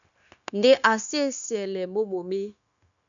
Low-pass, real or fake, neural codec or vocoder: 7.2 kHz; fake; codec, 16 kHz, 0.9 kbps, LongCat-Audio-Codec